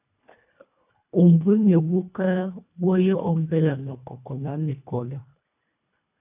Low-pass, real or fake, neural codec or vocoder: 3.6 kHz; fake; codec, 24 kHz, 1.5 kbps, HILCodec